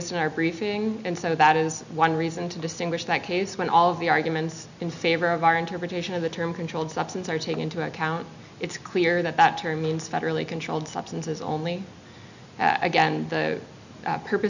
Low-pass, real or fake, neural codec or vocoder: 7.2 kHz; real; none